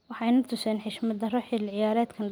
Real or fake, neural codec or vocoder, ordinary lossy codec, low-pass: real; none; none; none